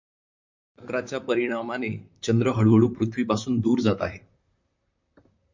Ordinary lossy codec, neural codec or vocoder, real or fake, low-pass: MP3, 48 kbps; vocoder, 44.1 kHz, 128 mel bands, Pupu-Vocoder; fake; 7.2 kHz